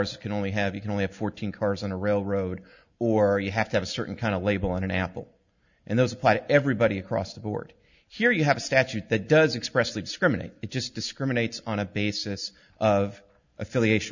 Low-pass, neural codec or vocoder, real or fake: 7.2 kHz; none; real